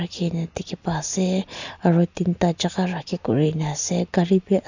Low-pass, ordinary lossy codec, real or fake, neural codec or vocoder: 7.2 kHz; none; real; none